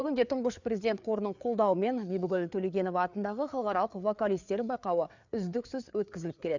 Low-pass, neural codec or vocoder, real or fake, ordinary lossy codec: 7.2 kHz; codec, 16 kHz, 4 kbps, FreqCodec, larger model; fake; none